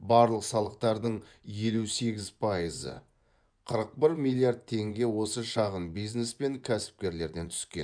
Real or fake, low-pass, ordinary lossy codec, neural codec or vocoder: fake; 9.9 kHz; none; vocoder, 24 kHz, 100 mel bands, Vocos